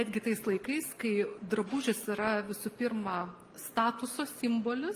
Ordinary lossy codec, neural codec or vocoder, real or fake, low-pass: Opus, 32 kbps; none; real; 14.4 kHz